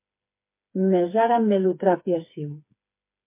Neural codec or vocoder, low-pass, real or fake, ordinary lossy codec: codec, 16 kHz, 4 kbps, FreqCodec, smaller model; 3.6 kHz; fake; MP3, 24 kbps